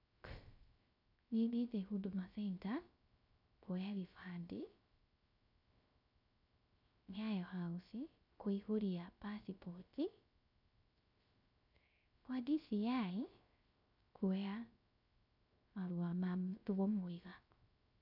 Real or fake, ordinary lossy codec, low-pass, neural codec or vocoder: fake; none; 5.4 kHz; codec, 16 kHz, 0.3 kbps, FocalCodec